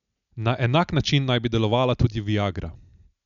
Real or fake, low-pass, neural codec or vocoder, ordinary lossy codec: real; 7.2 kHz; none; none